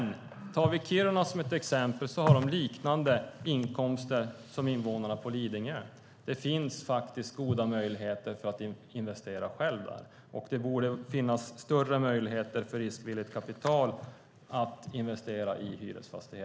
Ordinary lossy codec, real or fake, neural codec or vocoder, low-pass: none; real; none; none